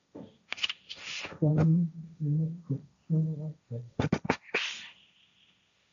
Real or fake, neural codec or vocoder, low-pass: fake; codec, 16 kHz, 1.1 kbps, Voila-Tokenizer; 7.2 kHz